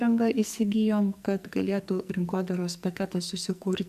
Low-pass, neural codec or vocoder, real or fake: 14.4 kHz; codec, 44.1 kHz, 2.6 kbps, SNAC; fake